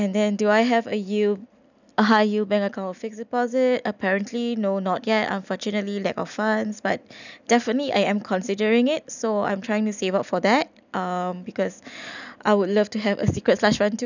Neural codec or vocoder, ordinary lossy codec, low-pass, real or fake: none; none; 7.2 kHz; real